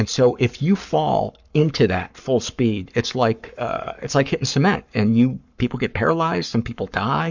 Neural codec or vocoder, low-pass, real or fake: codec, 44.1 kHz, 7.8 kbps, Pupu-Codec; 7.2 kHz; fake